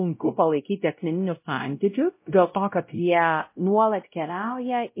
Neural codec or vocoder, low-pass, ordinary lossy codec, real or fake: codec, 16 kHz, 0.5 kbps, X-Codec, WavLM features, trained on Multilingual LibriSpeech; 3.6 kHz; MP3, 24 kbps; fake